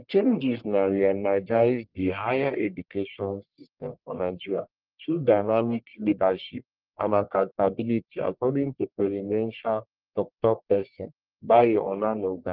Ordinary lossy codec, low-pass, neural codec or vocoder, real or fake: Opus, 32 kbps; 5.4 kHz; codec, 44.1 kHz, 1.7 kbps, Pupu-Codec; fake